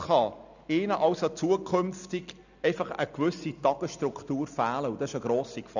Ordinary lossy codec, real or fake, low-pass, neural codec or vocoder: none; real; 7.2 kHz; none